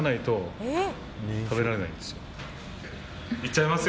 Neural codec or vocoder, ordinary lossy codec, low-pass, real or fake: none; none; none; real